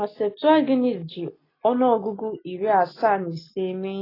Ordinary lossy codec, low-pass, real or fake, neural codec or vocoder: AAC, 24 kbps; 5.4 kHz; real; none